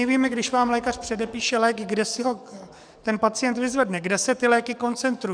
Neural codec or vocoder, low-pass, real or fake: codec, 44.1 kHz, 7.8 kbps, DAC; 9.9 kHz; fake